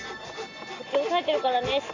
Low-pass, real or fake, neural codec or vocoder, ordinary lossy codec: 7.2 kHz; real; none; AAC, 48 kbps